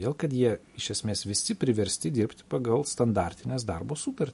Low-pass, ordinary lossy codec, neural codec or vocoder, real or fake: 14.4 kHz; MP3, 48 kbps; none; real